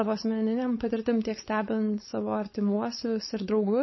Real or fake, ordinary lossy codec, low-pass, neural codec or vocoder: fake; MP3, 24 kbps; 7.2 kHz; codec, 16 kHz, 4.8 kbps, FACodec